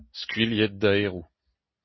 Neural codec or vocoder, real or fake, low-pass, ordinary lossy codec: none; real; 7.2 kHz; MP3, 24 kbps